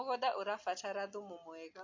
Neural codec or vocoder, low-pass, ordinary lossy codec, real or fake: none; 7.2 kHz; MP3, 48 kbps; real